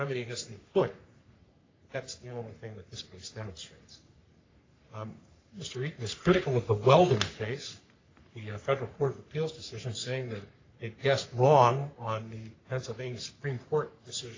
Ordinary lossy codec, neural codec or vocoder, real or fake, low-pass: AAC, 32 kbps; codec, 44.1 kHz, 3.4 kbps, Pupu-Codec; fake; 7.2 kHz